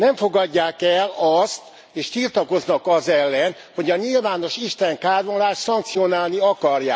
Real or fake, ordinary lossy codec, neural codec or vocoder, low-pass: real; none; none; none